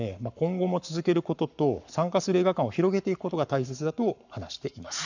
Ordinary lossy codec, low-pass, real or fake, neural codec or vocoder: none; 7.2 kHz; fake; codec, 44.1 kHz, 7.8 kbps, Pupu-Codec